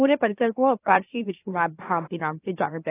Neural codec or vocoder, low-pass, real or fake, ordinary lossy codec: autoencoder, 44.1 kHz, a latent of 192 numbers a frame, MeloTTS; 3.6 kHz; fake; AAC, 16 kbps